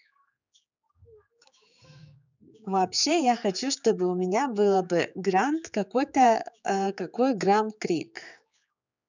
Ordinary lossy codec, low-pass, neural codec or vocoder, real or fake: none; 7.2 kHz; codec, 16 kHz, 4 kbps, X-Codec, HuBERT features, trained on general audio; fake